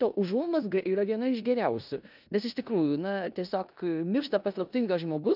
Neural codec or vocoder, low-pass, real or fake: codec, 16 kHz in and 24 kHz out, 0.9 kbps, LongCat-Audio-Codec, four codebook decoder; 5.4 kHz; fake